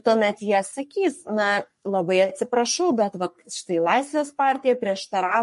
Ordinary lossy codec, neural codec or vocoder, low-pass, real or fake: MP3, 48 kbps; codec, 44.1 kHz, 3.4 kbps, Pupu-Codec; 14.4 kHz; fake